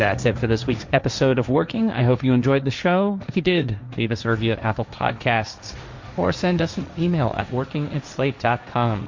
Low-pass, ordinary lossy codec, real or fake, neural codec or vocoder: 7.2 kHz; MP3, 64 kbps; fake; codec, 16 kHz, 1.1 kbps, Voila-Tokenizer